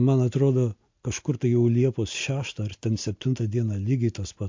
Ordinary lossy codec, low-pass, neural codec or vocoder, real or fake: MP3, 48 kbps; 7.2 kHz; none; real